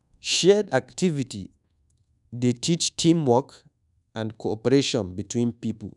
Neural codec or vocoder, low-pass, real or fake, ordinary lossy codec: codec, 24 kHz, 1.2 kbps, DualCodec; 10.8 kHz; fake; none